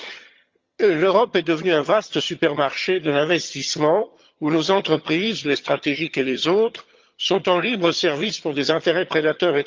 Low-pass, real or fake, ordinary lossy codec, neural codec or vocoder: 7.2 kHz; fake; Opus, 32 kbps; vocoder, 22.05 kHz, 80 mel bands, HiFi-GAN